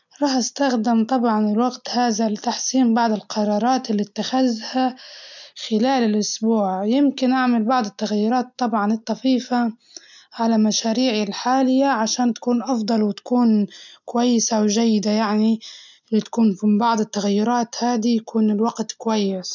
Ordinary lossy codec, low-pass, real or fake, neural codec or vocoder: none; 7.2 kHz; real; none